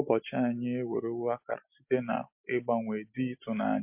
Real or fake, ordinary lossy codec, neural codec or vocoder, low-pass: real; MP3, 32 kbps; none; 3.6 kHz